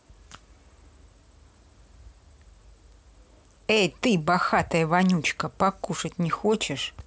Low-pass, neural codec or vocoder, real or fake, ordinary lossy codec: none; none; real; none